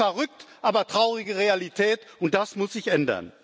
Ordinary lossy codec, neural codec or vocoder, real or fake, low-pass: none; none; real; none